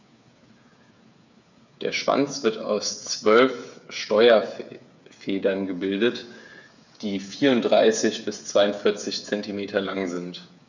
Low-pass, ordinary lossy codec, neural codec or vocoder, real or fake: 7.2 kHz; none; codec, 16 kHz, 8 kbps, FreqCodec, smaller model; fake